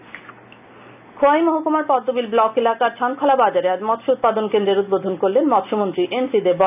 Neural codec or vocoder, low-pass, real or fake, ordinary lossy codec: none; 3.6 kHz; real; none